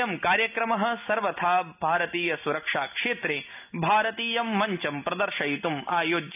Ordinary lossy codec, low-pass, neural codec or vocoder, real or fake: none; 3.6 kHz; none; real